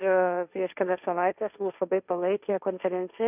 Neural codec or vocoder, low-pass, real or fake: codec, 16 kHz, 1.1 kbps, Voila-Tokenizer; 3.6 kHz; fake